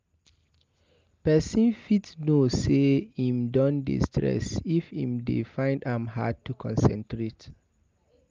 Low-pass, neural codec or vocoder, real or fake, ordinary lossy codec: 7.2 kHz; none; real; Opus, 24 kbps